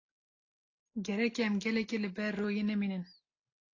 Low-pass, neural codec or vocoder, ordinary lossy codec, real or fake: 7.2 kHz; none; AAC, 32 kbps; real